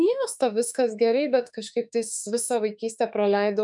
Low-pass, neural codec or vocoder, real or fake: 10.8 kHz; autoencoder, 48 kHz, 32 numbers a frame, DAC-VAE, trained on Japanese speech; fake